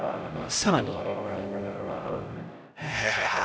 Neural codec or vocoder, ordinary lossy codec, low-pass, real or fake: codec, 16 kHz, 0.5 kbps, X-Codec, HuBERT features, trained on LibriSpeech; none; none; fake